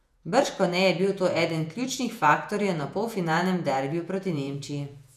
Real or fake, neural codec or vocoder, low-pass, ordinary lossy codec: fake; vocoder, 48 kHz, 128 mel bands, Vocos; 14.4 kHz; none